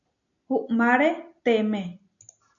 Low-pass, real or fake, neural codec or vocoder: 7.2 kHz; real; none